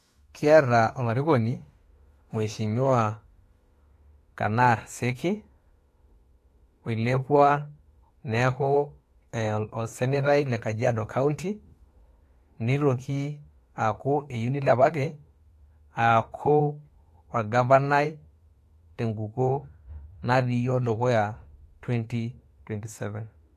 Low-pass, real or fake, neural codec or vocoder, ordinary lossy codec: 14.4 kHz; fake; autoencoder, 48 kHz, 32 numbers a frame, DAC-VAE, trained on Japanese speech; AAC, 48 kbps